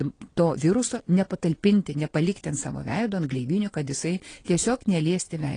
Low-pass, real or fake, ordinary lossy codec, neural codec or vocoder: 9.9 kHz; fake; AAC, 32 kbps; vocoder, 22.05 kHz, 80 mel bands, WaveNeXt